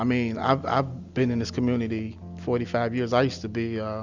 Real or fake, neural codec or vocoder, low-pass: real; none; 7.2 kHz